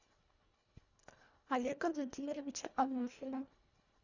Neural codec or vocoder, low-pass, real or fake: codec, 24 kHz, 1.5 kbps, HILCodec; 7.2 kHz; fake